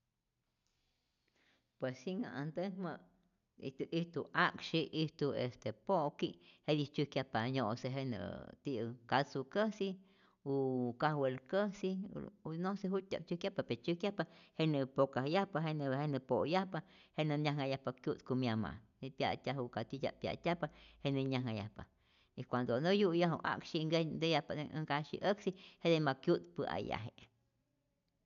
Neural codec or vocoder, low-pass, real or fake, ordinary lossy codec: none; 7.2 kHz; real; none